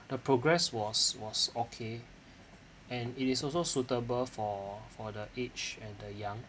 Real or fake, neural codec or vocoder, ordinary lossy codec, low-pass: real; none; none; none